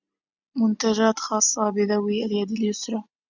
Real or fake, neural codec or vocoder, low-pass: real; none; 7.2 kHz